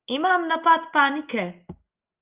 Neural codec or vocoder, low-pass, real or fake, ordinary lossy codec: none; 3.6 kHz; real; Opus, 32 kbps